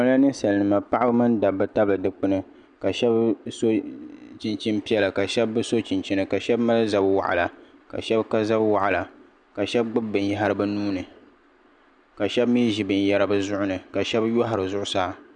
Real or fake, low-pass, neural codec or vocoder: real; 9.9 kHz; none